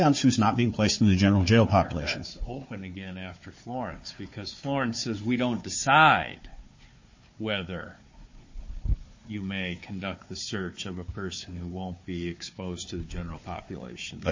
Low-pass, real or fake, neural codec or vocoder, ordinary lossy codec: 7.2 kHz; fake; codec, 16 kHz, 4 kbps, FunCodec, trained on Chinese and English, 50 frames a second; MP3, 32 kbps